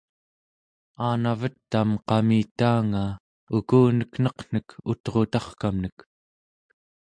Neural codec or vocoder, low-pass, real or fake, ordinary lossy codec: none; 9.9 kHz; real; MP3, 64 kbps